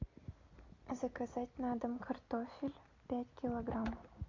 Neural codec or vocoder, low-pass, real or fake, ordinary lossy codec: none; 7.2 kHz; real; MP3, 48 kbps